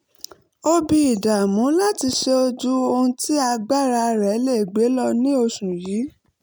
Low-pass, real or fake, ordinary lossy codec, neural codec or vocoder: none; real; none; none